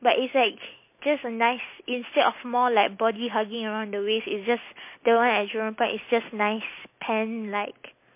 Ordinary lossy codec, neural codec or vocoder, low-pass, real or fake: MP3, 24 kbps; none; 3.6 kHz; real